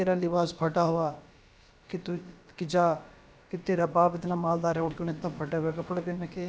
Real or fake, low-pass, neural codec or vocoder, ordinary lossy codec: fake; none; codec, 16 kHz, about 1 kbps, DyCAST, with the encoder's durations; none